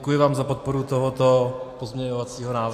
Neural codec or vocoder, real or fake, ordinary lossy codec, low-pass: none; real; AAC, 64 kbps; 14.4 kHz